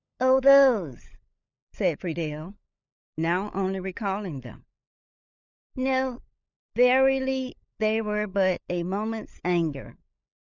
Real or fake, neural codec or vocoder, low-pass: fake; codec, 16 kHz, 16 kbps, FunCodec, trained on LibriTTS, 50 frames a second; 7.2 kHz